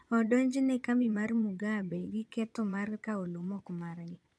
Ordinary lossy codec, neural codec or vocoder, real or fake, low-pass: none; vocoder, 22.05 kHz, 80 mel bands, Vocos; fake; none